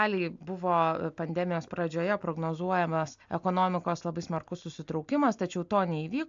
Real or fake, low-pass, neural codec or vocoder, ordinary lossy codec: real; 7.2 kHz; none; AAC, 64 kbps